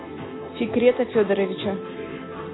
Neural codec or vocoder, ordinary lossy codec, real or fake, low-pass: none; AAC, 16 kbps; real; 7.2 kHz